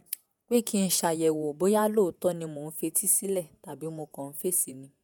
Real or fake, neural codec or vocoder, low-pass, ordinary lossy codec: fake; vocoder, 48 kHz, 128 mel bands, Vocos; none; none